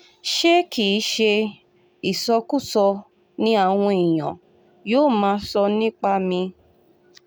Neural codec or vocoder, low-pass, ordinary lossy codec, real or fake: none; none; none; real